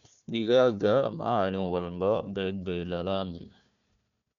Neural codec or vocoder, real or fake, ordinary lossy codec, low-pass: codec, 16 kHz, 1 kbps, FunCodec, trained on Chinese and English, 50 frames a second; fake; none; 7.2 kHz